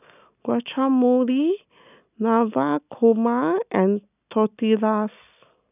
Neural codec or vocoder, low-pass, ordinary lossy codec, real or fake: none; 3.6 kHz; none; real